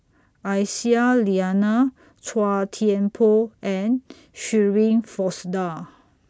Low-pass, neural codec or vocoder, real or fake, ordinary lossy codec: none; none; real; none